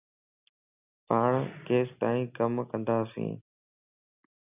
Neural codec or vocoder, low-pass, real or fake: none; 3.6 kHz; real